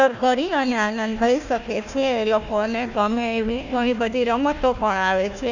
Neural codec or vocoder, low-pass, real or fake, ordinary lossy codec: codec, 16 kHz, 1 kbps, FunCodec, trained on Chinese and English, 50 frames a second; 7.2 kHz; fake; none